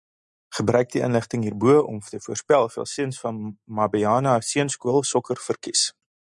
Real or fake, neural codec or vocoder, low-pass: real; none; 10.8 kHz